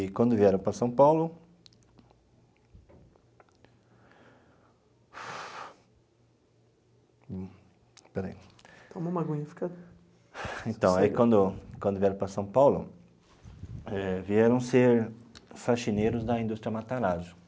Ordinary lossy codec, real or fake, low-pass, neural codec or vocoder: none; real; none; none